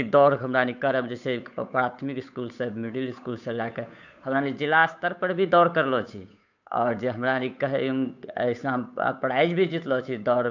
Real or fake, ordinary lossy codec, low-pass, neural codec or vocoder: fake; none; 7.2 kHz; codec, 16 kHz, 8 kbps, FunCodec, trained on Chinese and English, 25 frames a second